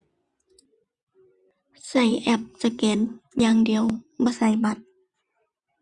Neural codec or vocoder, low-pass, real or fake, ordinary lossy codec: vocoder, 24 kHz, 100 mel bands, Vocos; 10.8 kHz; fake; Opus, 64 kbps